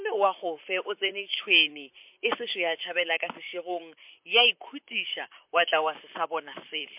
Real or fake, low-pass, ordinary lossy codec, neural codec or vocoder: fake; 3.6 kHz; MP3, 32 kbps; vocoder, 44.1 kHz, 128 mel bands, Pupu-Vocoder